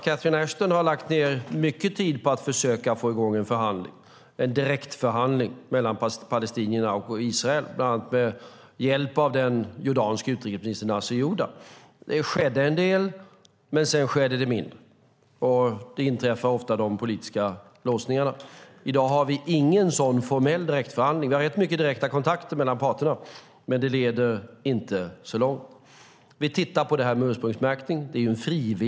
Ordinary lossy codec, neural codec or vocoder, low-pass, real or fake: none; none; none; real